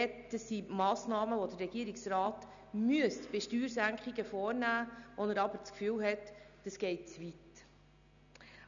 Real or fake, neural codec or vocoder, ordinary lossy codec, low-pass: real; none; none; 7.2 kHz